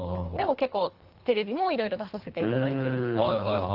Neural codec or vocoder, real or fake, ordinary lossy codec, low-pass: codec, 24 kHz, 3 kbps, HILCodec; fake; Opus, 16 kbps; 5.4 kHz